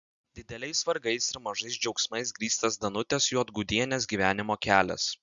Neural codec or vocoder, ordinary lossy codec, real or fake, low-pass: none; Opus, 64 kbps; real; 7.2 kHz